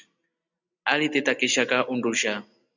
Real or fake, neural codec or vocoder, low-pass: real; none; 7.2 kHz